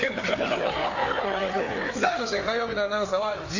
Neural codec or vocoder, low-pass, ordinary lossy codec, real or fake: codec, 16 kHz, 4 kbps, FreqCodec, larger model; 7.2 kHz; AAC, 48 kbps; fake